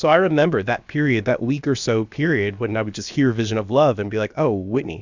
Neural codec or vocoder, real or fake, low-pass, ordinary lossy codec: codec, 16 kHz, about 1 kbps, DyCAST, with the encoder's durations; fake; 7.2 kHz; Opus, 64 kbps